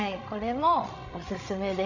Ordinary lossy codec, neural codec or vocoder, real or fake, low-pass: none; codec, 16 kHz, 16 kbps, FreqCodec, larger model; fake; 7.2 kHz